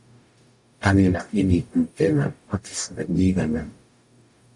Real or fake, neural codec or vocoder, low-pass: fake; codec, 44.1 kHz, 0.9 kbps, DAC; 10.8 kHz